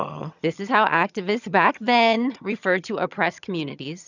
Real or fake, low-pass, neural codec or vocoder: fake; 7.2 kHz; vocoder, 22.05 kHz, 80 mel bands, HiFi-GAN